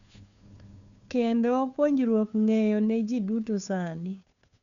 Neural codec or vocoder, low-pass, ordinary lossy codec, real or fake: codec, 16 kHz, 2 kbps, FunCodec, trained on Chinese and English, 25 frames a second; 7.2 kHz; none; fake